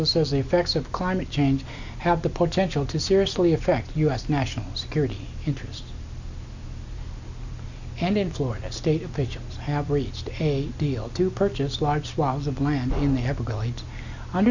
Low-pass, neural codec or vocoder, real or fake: 7.2 kHz; none; real